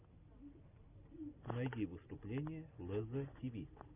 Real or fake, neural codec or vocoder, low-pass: real; none; 3.6 kHz